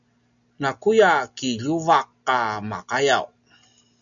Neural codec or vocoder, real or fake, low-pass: none; real; 7.2 kHz